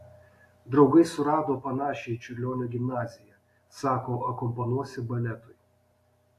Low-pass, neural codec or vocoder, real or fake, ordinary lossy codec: 14.4 kHz; none; real; AAC, 64 kbps